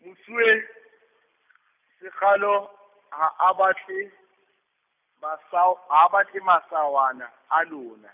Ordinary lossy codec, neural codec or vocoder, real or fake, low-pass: none; none; real; 3.6 kHz